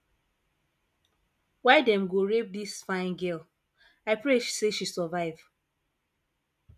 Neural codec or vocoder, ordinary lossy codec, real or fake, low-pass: none; none; real; 14.4 kHz